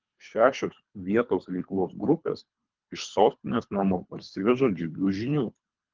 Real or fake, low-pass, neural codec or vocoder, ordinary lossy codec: fake; 7.2 kHz; codec, 24 kHz, 3 kbps, HILCodec; Opus, 32 kbps